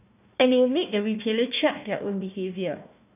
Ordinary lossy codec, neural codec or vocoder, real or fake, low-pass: none; codec, 16 kHz, 1 kbps, FunCodec, trained on Chinese and English, 50 frames a second; fake; 3.6 kHz